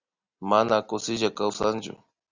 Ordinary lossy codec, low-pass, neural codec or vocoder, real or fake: Opus, 64 kbps; 7.2 kHz; none; real